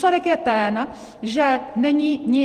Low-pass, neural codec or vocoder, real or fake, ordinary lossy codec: 14.4 kHz; vocoder, 48 kHz, 128 mel bands, Vocos; fake; Opus, 24 kbps